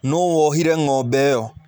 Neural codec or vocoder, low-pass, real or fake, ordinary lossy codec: none; none; real; none